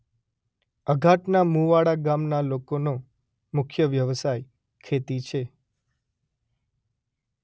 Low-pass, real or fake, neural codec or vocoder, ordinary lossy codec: none; real; none; none